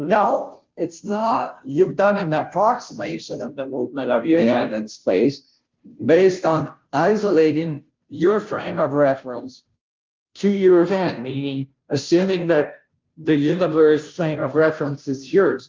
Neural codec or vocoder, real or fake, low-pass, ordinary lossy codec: codec, 16 kHz, 0.5 kbps, FunCodec, trained on Chinese and English, 25 frames a second; fake; 7.2 kHz; Opus, 32 kbps